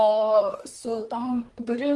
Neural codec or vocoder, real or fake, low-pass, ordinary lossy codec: codec, 24 kHz, 1 kbps, SNAC; fake; 10.8 kHz; Opus, 24 kbps